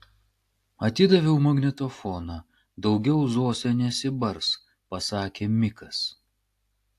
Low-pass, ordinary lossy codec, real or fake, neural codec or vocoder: 14.4 kHz; AAC, 64 kbps; real; none